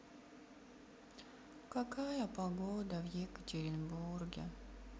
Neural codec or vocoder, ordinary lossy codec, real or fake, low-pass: none; none; real; none